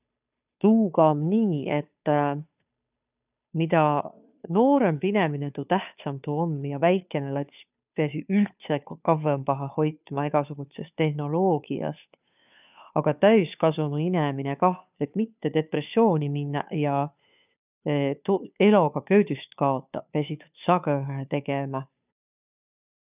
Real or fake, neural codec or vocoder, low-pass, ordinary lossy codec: fake; codec, 16 kHz, 2 kbps, FunCodec, trained on Chinese and English, 25 frames a second; 3.6 kHz; none